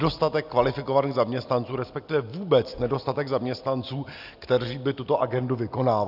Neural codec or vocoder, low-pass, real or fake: none; 5.4 kHz; real